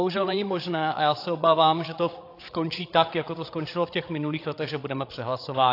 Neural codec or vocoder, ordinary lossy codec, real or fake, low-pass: codec, 16 kHz, 16 kbps, FreqCodec, larger model; AAC, 32 kbps; fake; 5.4 kHz